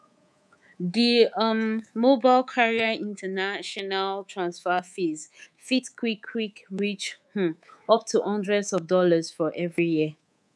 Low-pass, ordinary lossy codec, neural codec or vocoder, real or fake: none; none; codec, 24 kHz, 3.1 kbps, DualCodec; fake